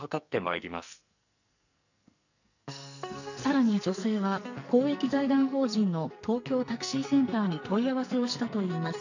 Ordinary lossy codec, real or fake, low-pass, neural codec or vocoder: none; fake; 7.2 kHz; codec, 44.1 kHz, 2.6 kbps, SNAC